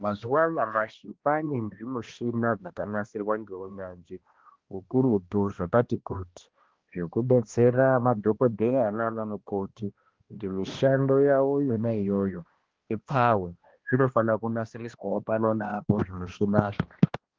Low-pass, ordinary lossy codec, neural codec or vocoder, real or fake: 7.2 kHz; Opus, 16 kbps; codec, 16 kHz, 1 kbps, X-Codec, HuBERT features, trained on balanced general audio; fake